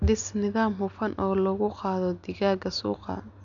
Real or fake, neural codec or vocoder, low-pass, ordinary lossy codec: real; none; 7.2 kHz; none